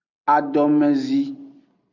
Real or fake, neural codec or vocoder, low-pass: real; none; 7.2 kHz